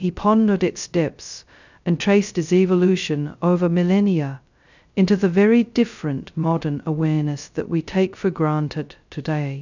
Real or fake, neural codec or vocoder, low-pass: fake; codec, 16 kHz, 0.2 kbps, FocalCodec; 7.2 kHz